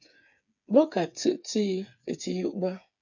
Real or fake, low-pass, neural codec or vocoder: fake; 7.2 kHz; codec, 16 kHz, 8 kbps, FreqCodec, smaller model